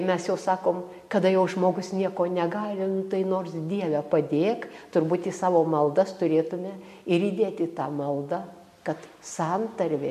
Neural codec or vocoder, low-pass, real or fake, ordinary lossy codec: none; 14.4 kHz; real; MP3, 64 kbps